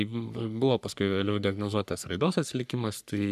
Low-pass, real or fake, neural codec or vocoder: 14.4 kHz; fake; codec, 44.1 kHz, 3.4 kbps, Pupu-Codec